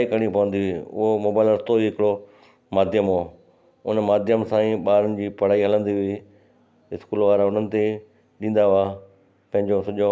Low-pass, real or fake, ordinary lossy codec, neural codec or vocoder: none; real; none; none